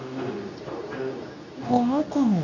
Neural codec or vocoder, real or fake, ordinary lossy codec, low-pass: codec, 24 kHz, 0.9 kbps, WavTokenizer, medium speech release version 2; fake; none; 7.2 kHz